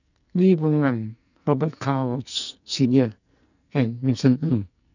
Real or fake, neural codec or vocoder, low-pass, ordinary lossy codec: fake; codec, 44.1 kHz, 2.6 kbps, SNAC; 7.2 kHz; none